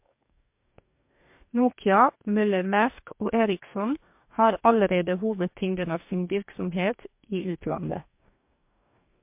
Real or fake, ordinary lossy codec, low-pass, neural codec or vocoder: fake; MP3, 32 kbps; 3.6 kHz; codec, 44.1 kHz, 2.6 kbps, DAC